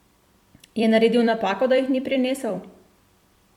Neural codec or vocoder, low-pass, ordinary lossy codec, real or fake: vocoder, 44.1 kHz, 128 mel bands every 256 samples, BigVGAN v2; 19.8 kHz; MP3, 96 kbps; fake